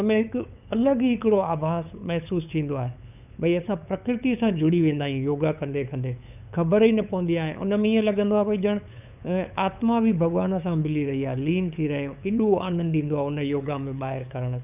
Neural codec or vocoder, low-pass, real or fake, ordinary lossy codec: codec, 16 kHz, 8 kbps, FunCodec, trained on LibriTTS, 25 frames a second; 3.6 kHz; fake; none